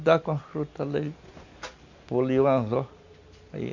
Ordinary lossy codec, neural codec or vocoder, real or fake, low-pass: none; none; real; 7.2 kHz